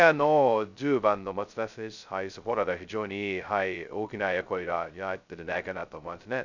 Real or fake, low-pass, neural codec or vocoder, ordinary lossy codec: fake; 7.2 kHz; codec, 16 kHz, 0.2 kbps, FocalCodec; none